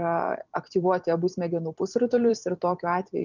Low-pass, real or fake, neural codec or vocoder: 7.2 kHz; fake; vocoder, 44.1 kHz, 128 mel bands every 256 samples, BigVGAN v2